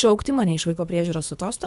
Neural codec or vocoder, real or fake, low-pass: codec, 24 kHz, 3 kbps, HILCodec; fake; 10.8 kHz